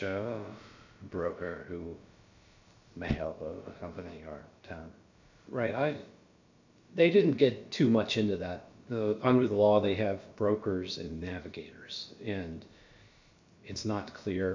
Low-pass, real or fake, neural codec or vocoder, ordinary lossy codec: 7.2 kHz; fake; codec, 16 kHz, about 1 kbps, DyCAST, with the encoder's durations; MP3, 48 kbps